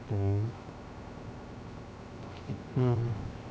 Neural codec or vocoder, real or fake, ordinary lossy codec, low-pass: codec, 16 kHz, 0.3 kbps, FocalCodec; fake; none; none